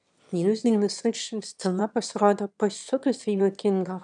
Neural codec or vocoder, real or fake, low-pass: autoencoder, 22.05 kHz, a latent of 192 numbers a frame, VITS, trained on one speaker; fake; 9.9 kHz